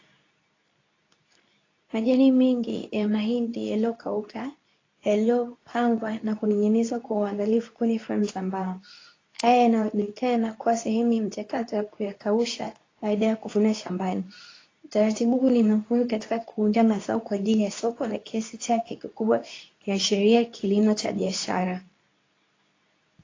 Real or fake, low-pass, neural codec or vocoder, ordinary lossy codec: fake; 7.2 kHz; codec, 24 kHz, 0.9 kbps, WavTokenizer, medium speech release version 2; AAC, 32 kbps